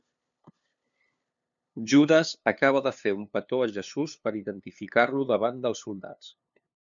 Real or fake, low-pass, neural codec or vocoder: fake; 7.2 kHz; codec, 16 kHz, 2 kbps, FunCodec, trained on LibriTTS, 25 frames a second